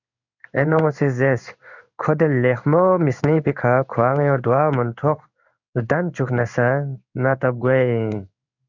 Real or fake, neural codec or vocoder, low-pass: fake; codec, 16 kHz in and 24 kHz out, 1 kbps, XY-Tokenizer; 7.2 kHz